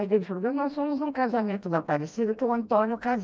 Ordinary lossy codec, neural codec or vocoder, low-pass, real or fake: none; codec, 16 kHz, 1 kbps, FreqCodec, smaller model; none; fake